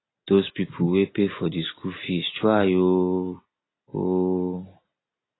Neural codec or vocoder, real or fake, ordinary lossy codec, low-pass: none; real; AAC, 16 kbps; 7.2 kHz